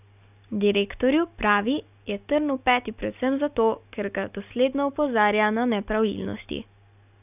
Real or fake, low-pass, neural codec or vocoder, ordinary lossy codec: real; 3.6 kHz; none; none